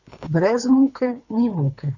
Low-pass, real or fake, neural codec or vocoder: 7.2 kHz; fake; codec, 24 kHz, 3 kbps, HILCodec